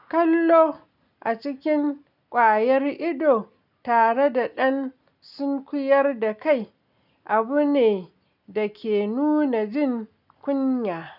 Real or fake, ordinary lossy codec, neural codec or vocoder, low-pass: real; none; none; 5.4 kHz